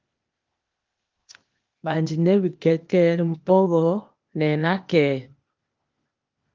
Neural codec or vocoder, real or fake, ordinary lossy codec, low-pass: codec, 16 kHz, 0.8 kbps, ZipCodec; fake; Opus, 32 kbps; 7.2 kHz